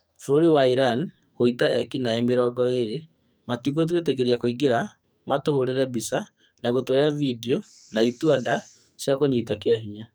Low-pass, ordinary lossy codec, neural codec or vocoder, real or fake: none; none; codec, 44.1 kHz, 2.6 kbps, SNAC; fake